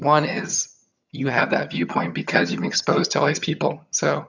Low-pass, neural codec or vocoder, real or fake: 7.2 kHz; vocoder, 22.05 kHz, 80 mel bands, HiFi-GAN; fake